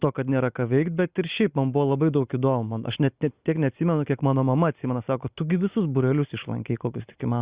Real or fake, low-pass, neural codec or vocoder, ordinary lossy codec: real; 3.6 kHz; none; Opus, 64 kbps